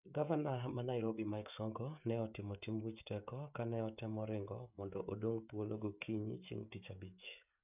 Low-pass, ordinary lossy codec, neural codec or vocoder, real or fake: 3.6 kHz; none; codec, 16 kHz, 16 kbps, FreqCodec, smaller model; fake